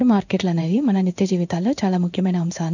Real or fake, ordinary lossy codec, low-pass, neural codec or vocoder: fake; none; 7.2 kHz; codec, 16 kHz in and 24 kHz out, 1 kbps, XY-Tokenizer